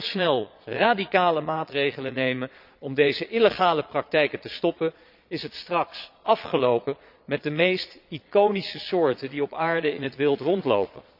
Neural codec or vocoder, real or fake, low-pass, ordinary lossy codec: vocoder, 22.05 kHz, 80 mel bands, Vocos; fake; 5.4 kHz; none